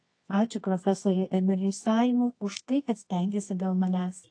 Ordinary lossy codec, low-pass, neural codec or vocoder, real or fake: AAC, 48 kbps; 9.9 kHz; codec, 24 kHz, 0.9 kbps, WavTokenizer, medium music audio release; fake